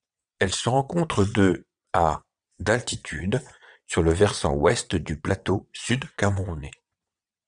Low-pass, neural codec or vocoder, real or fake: 9.9 kHz; vocoder, 22.05 kHz, 80 mel bands, WaveNeXt; fake